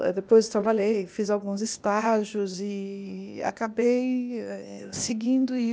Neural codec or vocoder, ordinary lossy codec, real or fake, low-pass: codec, 16 kHz, 0.8 kbps, ZipCodec; none; fake; none